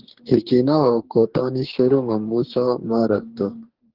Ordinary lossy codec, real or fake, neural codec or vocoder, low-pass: Opus, 16 kbps; fake; codec, 44.1 kHz, 2.6 kbps, DAC; 5.4 kHz